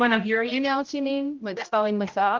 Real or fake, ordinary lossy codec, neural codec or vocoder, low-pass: fake; Opus, 32 kbps; codec, 16 kHz, 0.5 kbps, X-Codec, HuBERT features, trained on general audio; 7.2 kHz